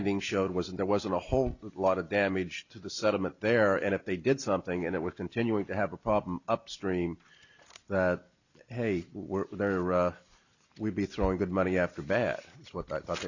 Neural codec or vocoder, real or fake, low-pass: none; real; 7.2 kHz